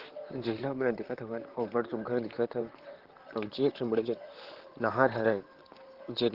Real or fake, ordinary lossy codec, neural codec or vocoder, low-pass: fake; Opus, 16 kbps; vocoder, 44.1 kHz, 128 mel bands, Pupu-Vocoder; 5.4 kHz